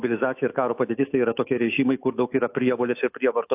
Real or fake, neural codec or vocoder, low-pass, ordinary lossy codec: fake; autoencoder, 48 kHz, 128 numbers a frame, DAC-VAE, trained on Japanese speech; 3.6 kHz; AAC, 32 kbps